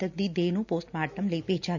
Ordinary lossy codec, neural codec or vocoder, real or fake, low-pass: none; none; real; 7.2 kHz